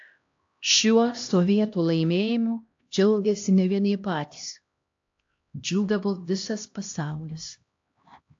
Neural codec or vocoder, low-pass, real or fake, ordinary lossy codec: codec, 16 kHz, 1 kbps, X-Codec, HuBERT features, trained on LibriSpeech; 7.2 kHz; fake; AAC, 48 kbps